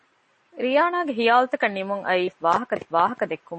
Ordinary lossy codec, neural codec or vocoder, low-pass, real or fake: MP3, 32 kbps; none; 10.8 kHz; real